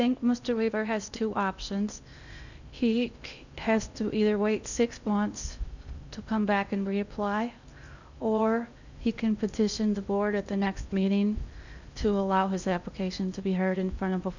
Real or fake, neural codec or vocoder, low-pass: fake; codec, 16 kHz in and 24 kHz out, 0.8 kbps, FocalCodec, streaming, 65536 codes; 7.2 kHz